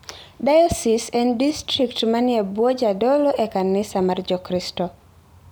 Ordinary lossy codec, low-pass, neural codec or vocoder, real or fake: none; none; none; real